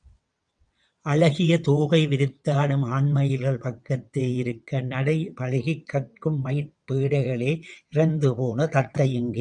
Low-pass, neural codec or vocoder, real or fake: 9.9 kHz; vocoder, 22.05 kHz, 80 mel bands, WaveNeXt; fake